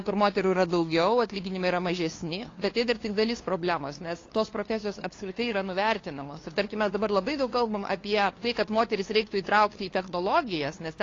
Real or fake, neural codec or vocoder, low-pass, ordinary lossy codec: fake; codec, 16 kHz, 2 kbps, FunCodec, trained on LibriTTS, 25 frames a second; 7.2 kHz; AAC, 32 kbps